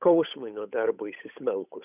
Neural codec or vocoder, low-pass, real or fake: codec, 16 kHz, 8 kbps, FunCodec, trained on Chinese and English, 25 frames a second; 3.6 kHz; fake